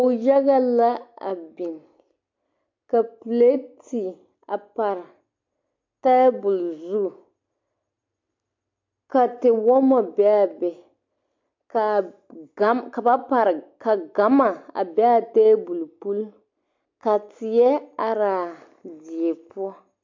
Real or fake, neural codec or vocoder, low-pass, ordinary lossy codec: real; none; 7.2 kHz; MP3, 48 kbps